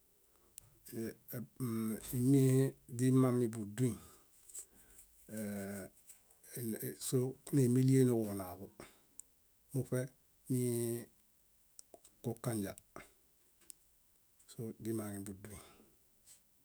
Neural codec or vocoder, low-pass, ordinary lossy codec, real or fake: autoencoder, 48 kHz, 128 numbers a frame, DAC-VAE, trained on Japanese speech; none; none; fake